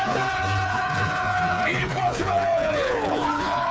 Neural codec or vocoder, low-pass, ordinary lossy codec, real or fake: codec, 16 kHz, 4 kbps, FreqCodec, smaller model; none; none; fake